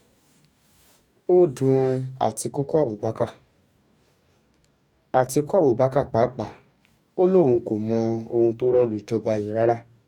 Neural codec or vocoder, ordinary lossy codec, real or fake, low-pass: codec, 44.1 kHz, 2.6 kbps, DAC; none; fake; 19.8 kHz